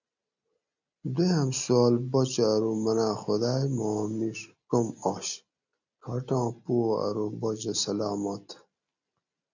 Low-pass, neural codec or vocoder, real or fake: 7.2 kHz; none; real